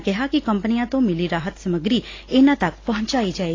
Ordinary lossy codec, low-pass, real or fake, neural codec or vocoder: AAC, 32 kbps; 7.2 kHz; real; none